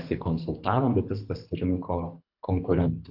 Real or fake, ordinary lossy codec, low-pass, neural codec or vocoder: fake; AAC, 48 kbps; 5.4 kHz; codec, 24 kHz, 3 kbps, HILCodec